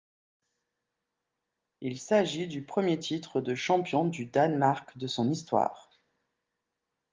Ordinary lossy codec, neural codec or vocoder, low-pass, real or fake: Opus, 32 kbps; none; 7.2 kHz; real